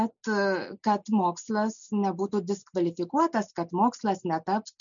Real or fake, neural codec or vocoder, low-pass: real; none; 7.2 kHz